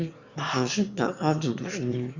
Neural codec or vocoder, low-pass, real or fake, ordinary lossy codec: autoencoder, 22.05 kHz, a latent of 192 numbers a frame, VITS, trained on one speaker; 7.2 kHz; fake; Opus, 64 kbps